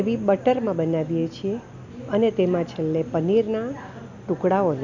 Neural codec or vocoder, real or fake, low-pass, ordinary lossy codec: none; real; 7.2 kHz; none